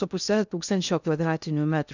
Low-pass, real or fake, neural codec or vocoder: 7.2 kHz; fake; codec, 16 kHz in and 24 kHz out, 0.6 kbps, FocalCodec, streaming, 2048 codes